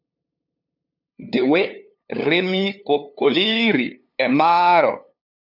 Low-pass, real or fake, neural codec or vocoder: 5.4 kHz; fake; codec, 16 kHz, 2 kbps, FunCodec, trained on LibriTTS, 25 frames a second